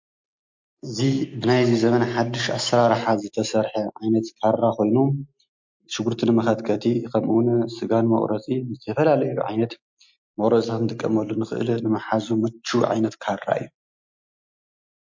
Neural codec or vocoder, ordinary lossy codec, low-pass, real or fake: none; MP3, 48 kbps; 7.2 kHz; real